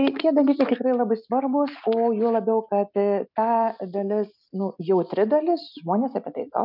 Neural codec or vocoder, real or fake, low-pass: none; real; 5.4 kHz